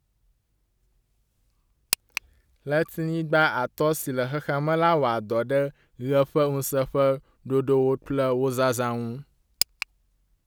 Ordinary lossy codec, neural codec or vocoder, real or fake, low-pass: none; none; real; none